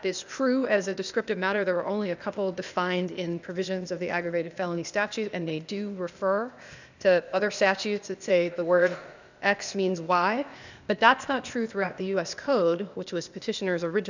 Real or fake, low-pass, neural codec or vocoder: fake; 7.2 kHz; codec, 16 kHz, 0.8 kbps, ZipCodec